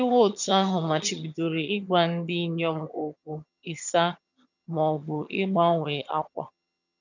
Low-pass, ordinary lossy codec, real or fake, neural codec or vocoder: 7.2 kHz; none; fake; vocoder, 22.05 kHz, 80 mel bands, HiFi-GAN